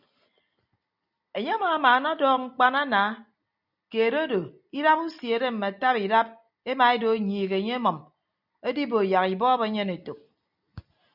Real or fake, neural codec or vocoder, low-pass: real; none; 5.4 kHz